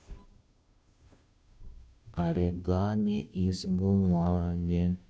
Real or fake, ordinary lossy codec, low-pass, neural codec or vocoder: fake; none; none; codec, 16 kHz, 0.5 kbps, FunCodec, trained on Chinese and English, 25 frames a second